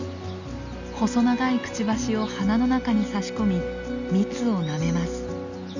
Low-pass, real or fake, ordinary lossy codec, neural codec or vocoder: 7.2 kHz; real; none; none